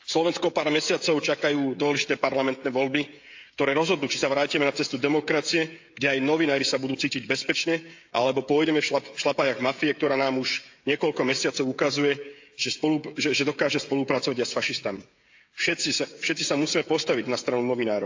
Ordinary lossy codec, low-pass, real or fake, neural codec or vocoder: AAC, 48 kbps; 7.2 kHz; fake; codec, 16 kHz, 16 kbps, FreqCodec, smaller model